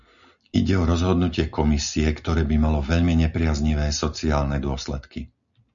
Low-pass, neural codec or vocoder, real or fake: 7.2 kHz; none; real